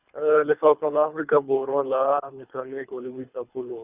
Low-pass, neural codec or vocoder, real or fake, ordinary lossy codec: 3.6 kHz; codec, 24 kHz, 3 kbps, HILCodec; fake; Opus, 64 kbps